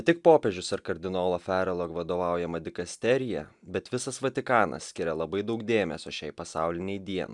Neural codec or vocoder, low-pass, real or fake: none; 10.8 kHz; real